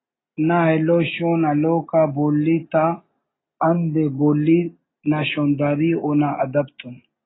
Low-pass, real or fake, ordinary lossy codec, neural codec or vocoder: 7.2 kHz; real; AAC, 16 kbps; none